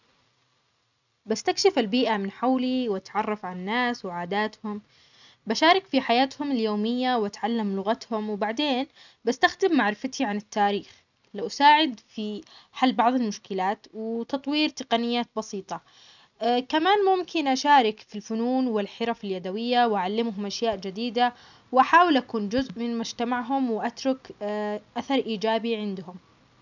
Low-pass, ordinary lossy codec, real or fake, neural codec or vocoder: 7.2 kHz; none; real; none